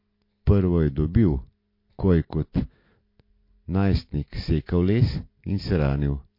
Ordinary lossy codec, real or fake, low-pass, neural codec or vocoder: MP3, 32 kbps; real; 5.4 kHz; none